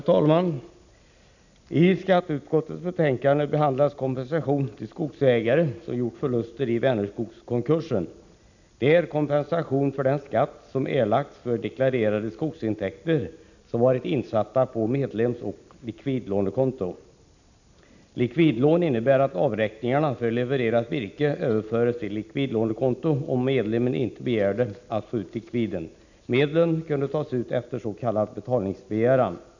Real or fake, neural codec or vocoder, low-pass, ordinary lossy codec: real; none; 7.2 kHz; none